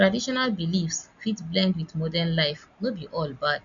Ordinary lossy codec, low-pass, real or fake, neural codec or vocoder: none; 7.2 kHz; real; none